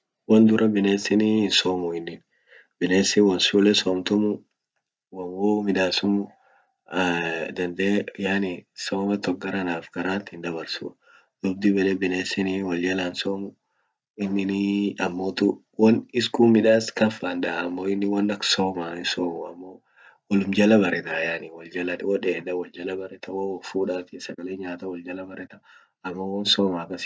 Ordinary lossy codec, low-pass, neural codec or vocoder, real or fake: none; none; none; real